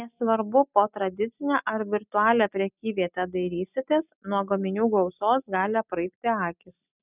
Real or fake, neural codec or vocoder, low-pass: real; none; 3.6 kHz